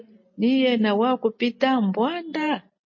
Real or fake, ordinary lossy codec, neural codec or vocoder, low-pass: real; MP3, 32 kbps; none; 7.2 kHz